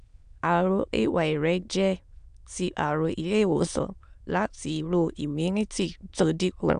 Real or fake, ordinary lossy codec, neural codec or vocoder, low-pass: fake; AAC, 96 kbps; autoencoder, 22.05 kHz, a latent of 192 numbers a frame, VITS, trained on many speakers; 9.9 kHz